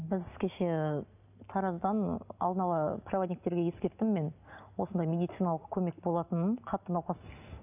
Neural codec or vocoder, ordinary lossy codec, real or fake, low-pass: none; none; real; 3.6 kHz